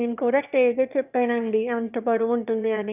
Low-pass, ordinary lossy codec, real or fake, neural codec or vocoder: 3.6 kHz; none; fake; autoencoder, 22.05 kHz, a latent of 192 numbers a frame, VITS, trained on one speaker